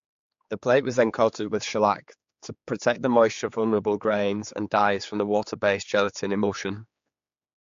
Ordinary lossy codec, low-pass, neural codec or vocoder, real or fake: MP3, 48 kbps; 7.2 kHz; codec, 16 kHz, 4 kbps, X-Codec, HuBERT features, trained on general audio; fake